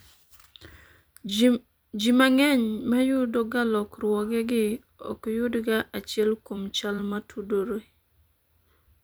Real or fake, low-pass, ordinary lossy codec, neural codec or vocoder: fake; none; none; vocoder, 44.1 kHz, 128 mel bands every 256 samples, BigVGAN v2